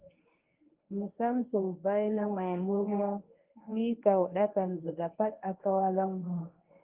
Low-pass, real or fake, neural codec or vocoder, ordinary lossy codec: 3.6 kHz; fake; codec, 24 kHz, 0.9 kbps, WavTokenizer, medium speech release version 1; Opus, 32 kbps